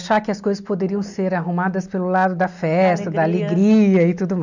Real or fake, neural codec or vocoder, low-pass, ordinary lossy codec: real; none; 7.2 kHz; none